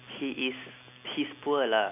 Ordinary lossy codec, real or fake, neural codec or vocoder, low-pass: none; real; none; 3.6 kHz